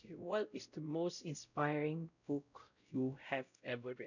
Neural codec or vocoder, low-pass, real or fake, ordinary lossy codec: codec, 16 kHz, 0.5 kbps, X-Codec, WavLM features, trained on Multilingual LibriSpeech; 7.2 kHz; fake; none